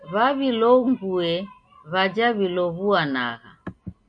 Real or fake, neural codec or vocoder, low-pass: real; none; 9.9 kHz